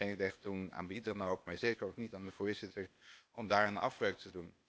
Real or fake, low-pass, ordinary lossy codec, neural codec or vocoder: fake; none; none; codec, 16 kHz, 0.8 kbps, ZipCodec